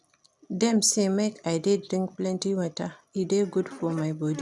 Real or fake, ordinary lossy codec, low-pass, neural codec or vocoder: real; none; none; none